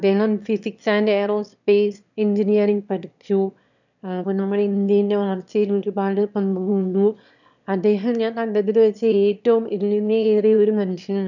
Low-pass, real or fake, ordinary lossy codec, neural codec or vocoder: 7.2 kHz; fake; none; autoencoder, 22.05 kHz, a latent of 192 numbers a frame, VITS, trained on one speaker